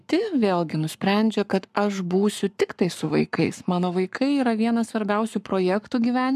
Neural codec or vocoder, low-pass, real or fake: codec, 44.1 kHz, 7.8 kbps, Pupu-Codec; 14.4 kHz; fake